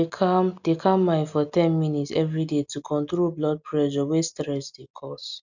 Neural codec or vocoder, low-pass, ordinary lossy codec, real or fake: none; 7.2 kHz; none; real